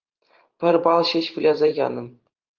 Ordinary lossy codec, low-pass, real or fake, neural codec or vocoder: Opus, 32 kbps; 7.2 kHz; fake; vocoder, 44.1 kHz, 128 mel bands every 512 samples, BigVGAN v2